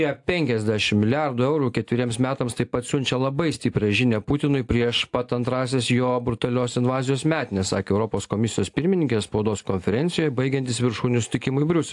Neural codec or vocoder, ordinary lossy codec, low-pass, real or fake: none; AAC, 64 kbps; 10.8 kHz; real